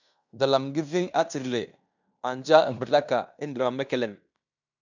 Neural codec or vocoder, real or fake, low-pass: codec, 16 kHz in and 24 kHz out, 0.9 kbps, LongCat-Audio-Codec, fine tuned four codebook decoder; fake; 7.2 kHz